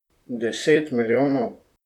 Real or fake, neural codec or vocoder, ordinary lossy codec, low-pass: fake; vocoder, 44.1 kHz, 128 mel bands, Pupu-Vocoder; none; 19.8 kHz